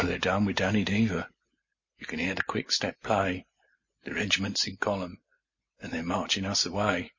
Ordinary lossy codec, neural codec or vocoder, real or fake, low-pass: MP3, 32 kbps; none; real; 7.2 kHz